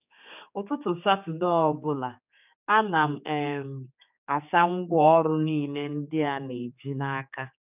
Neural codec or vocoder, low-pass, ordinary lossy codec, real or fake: codec, 16 kHz, 4 kbps, X-Codec, HuBERT features, trained on general audio; 3.6 kHz; none; fake